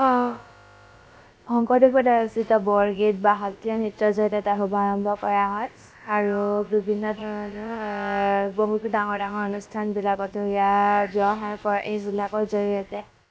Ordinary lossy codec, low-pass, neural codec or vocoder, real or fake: none; none; codec, 16 kHz, about 1 kbps, DyCAST, with the encoder's durations; fake